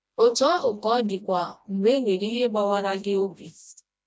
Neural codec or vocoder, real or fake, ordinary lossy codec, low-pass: codec, 16 kHz, 1 kbps, FreqCodec, smaller model; fake; none; none